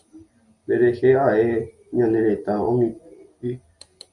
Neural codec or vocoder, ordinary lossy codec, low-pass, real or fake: none; Opus, 64 kbps; 10.8 kHz; real